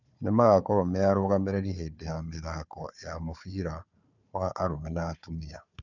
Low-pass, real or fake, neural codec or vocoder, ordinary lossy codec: 7.2 kHz; fake; codec, 16 kHz, 4 kbps, FunCodec, trained on Chinese and English, 50 frames a second; none